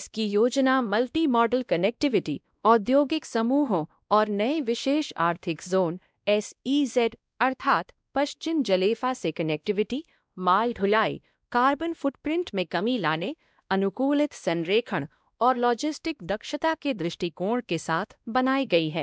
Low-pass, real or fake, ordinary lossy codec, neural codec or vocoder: none; fake; none; codec, 16 kHz, 1 kbps, X-Codec, WavLM features, trained on Multilingual LibriSpeech